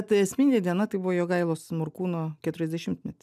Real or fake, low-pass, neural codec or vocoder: real; 14.4 kHz; none